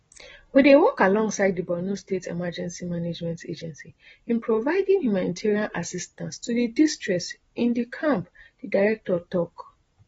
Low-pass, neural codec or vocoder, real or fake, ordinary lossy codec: 19.8 kHz; none; real; AAC, 24 kbps